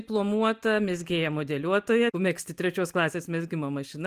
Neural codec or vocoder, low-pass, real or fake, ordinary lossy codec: none; 14.4 kHz; real; Opus, 32 kbps